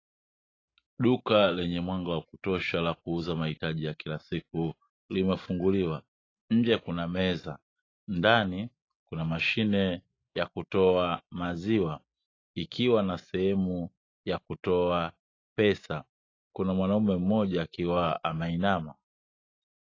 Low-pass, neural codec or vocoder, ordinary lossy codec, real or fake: 7.2 kHz; none; AAC, 32 kbps; real